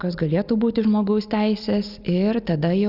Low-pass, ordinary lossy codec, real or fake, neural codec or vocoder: 5.4 kHz; Opus, 64 kbps; real; none